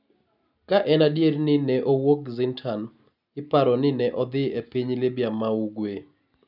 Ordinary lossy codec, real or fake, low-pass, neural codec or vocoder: none; real; 5.4 kHz; none